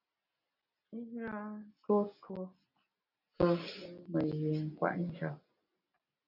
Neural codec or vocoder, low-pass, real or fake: none; 5.4 kHz; real